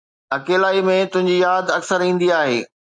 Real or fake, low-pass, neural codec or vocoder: real; 9.9 kHz; none